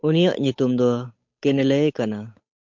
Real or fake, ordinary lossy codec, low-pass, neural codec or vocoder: fake; MP3, 48 kbps; 7.2 kHz; codec, 16 kHz, 8 kbps, FunCodec, trained on Chinese and English, 25 frames a second